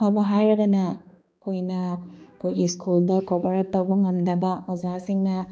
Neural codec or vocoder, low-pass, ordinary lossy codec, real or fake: codec, 16 kHz, 2 kbps, X-Codec, HuBERT features, trained on balanced general audio; none; none; fake